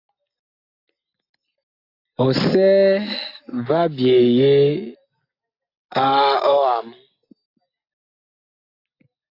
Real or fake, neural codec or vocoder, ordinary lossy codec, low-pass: real; none; AAC, 32 kbps; 5.4 kHz